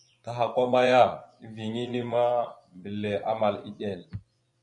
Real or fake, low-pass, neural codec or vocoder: fake; 10.8 kHz; vocoder, 24 kHz, 100 mel bands, Vocos